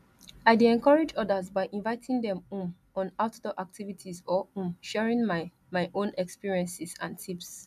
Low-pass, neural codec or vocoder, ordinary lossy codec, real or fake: 14.4 kHz; none; none; real